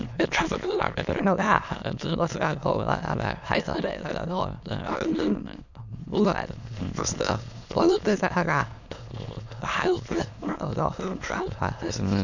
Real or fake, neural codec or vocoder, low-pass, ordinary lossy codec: fake; autoencoder, 22.05 kHz, a latent of 192 numbers a frame, VITS, trained on many speakers; 7.2 kHz; none